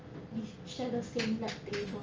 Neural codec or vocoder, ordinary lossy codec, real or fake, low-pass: none; Opus, 24 kbps; real; 7.2 kHz